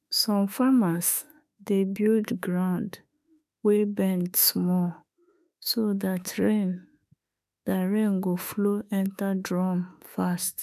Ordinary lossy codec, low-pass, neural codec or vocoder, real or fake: none; 14.4 kHz; autoencoder, 48 kHz, 32 numbers a frame, DAC-VAE, trained on Japanese speech; fake